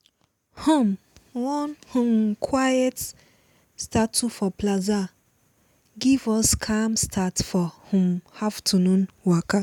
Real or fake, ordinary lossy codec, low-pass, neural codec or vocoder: real; none; 19.8 kHz; none